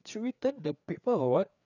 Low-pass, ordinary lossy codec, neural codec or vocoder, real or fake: 7.2 kHz; none; codec, 16 kHz, 4 kbps, FreqCodec, larger model; fake